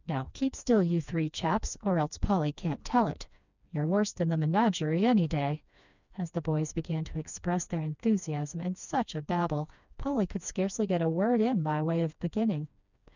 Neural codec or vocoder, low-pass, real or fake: codec, 16 kHz, 2 kbps, FreqCodec, smaller model; 7.2 kHz; fake